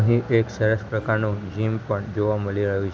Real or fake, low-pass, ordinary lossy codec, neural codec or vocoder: real; none; none; none